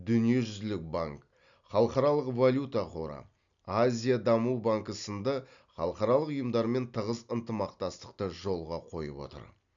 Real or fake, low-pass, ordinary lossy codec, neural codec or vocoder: real; 7.2 kHz; none; none